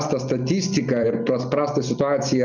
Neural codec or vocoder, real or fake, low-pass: none; real; 7.2 kHz